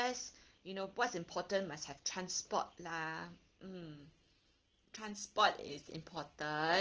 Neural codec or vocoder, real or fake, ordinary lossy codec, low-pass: none; real; Opus, 16 kbps; 7.2 kHz